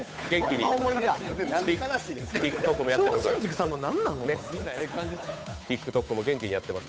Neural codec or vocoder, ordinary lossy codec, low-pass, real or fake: codec, 16 kHz, 8 kbps, FunCodec, trained on Chinese and English, 25 frames a second; none; none; fake